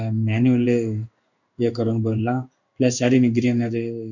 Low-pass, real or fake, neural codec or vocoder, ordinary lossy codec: 7.2 kHz; fake; codec, 16 kHz in and 24 kHz out, 1 kbps, XY-Tokenizer; none